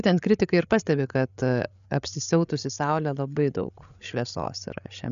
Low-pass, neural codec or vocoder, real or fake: 7.2 kHz; codec, 16 kHz, 8 kbps, FreqCodec, larger model; fake